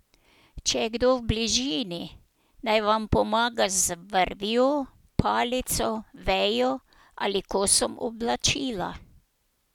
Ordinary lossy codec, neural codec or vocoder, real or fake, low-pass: none; none; real; 19.8 kHz